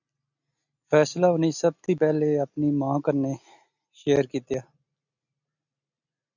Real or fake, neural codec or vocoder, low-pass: real; none; 7.2 kHz